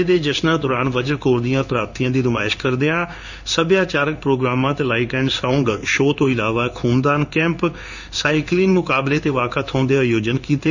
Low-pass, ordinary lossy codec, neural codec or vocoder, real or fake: 7.2 kHz; none; codec, 16 kHz in and 24 kHz out, 1 kbps, XY-Tokenizer; fake